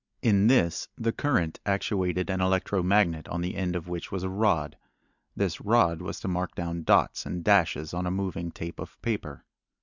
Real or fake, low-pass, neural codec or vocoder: real; 7.2 kHz; none